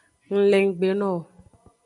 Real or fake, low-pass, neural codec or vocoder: real; 10.8 kHz; none